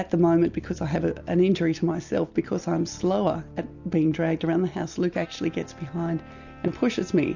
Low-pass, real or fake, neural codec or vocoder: 7.2 kHz; real; none